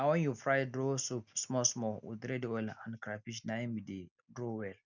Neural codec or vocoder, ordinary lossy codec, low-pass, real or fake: none; none; 7.2 kHz; real